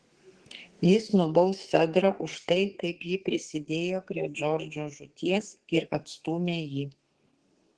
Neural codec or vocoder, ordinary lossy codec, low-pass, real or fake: codec, 32 kHz, 1.9 kbps, SNAC; Opus, 16 kbps; 10.8 kHz; fake